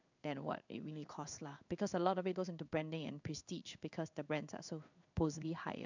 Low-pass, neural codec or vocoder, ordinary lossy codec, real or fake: 7.2 kHz; codec, 16 kHz in and 24 kHz out, 1 kbps, XY-Tokenizer; none; fake